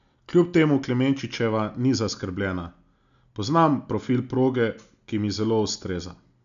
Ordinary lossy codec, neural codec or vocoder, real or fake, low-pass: none; none; real; 7.2 kHz